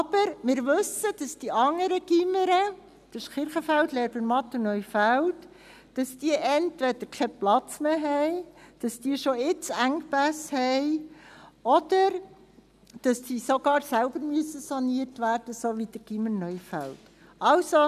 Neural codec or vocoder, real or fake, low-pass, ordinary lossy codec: none; real; 14.4 kHz; none